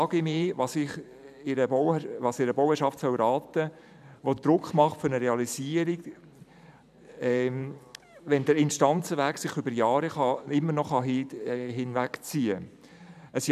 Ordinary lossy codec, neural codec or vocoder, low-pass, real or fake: none; vocoder, 44.1 kHz, 128 mel bands every 512 samples, BigVGAN v2; 14.4 kHz; fake